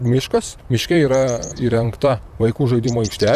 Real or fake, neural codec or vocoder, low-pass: fake; vocoder, 44.1 kHz, 128 mel bands, Pupu-Vocoder; 14.4 kHz